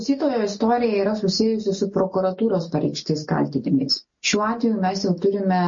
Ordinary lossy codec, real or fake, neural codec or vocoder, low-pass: MP3, 32 kbps; real; none; 7.2 kHz